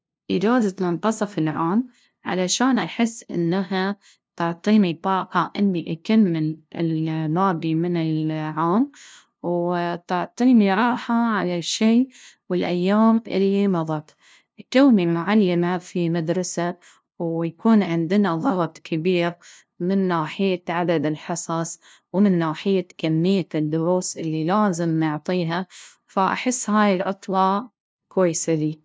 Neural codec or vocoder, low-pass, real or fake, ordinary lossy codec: codec, 16 kHz, 0.5 kbps, FunCodec, trained on LibriTTS, 25 frames a second; none; fake; none